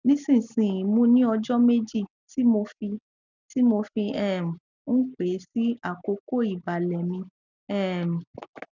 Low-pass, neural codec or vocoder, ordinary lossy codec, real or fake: 7.2 kHz; none; none; real